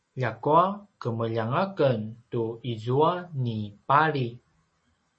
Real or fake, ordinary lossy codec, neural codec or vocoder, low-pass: real; MP3, 32 kbps; none; 9.9 kHz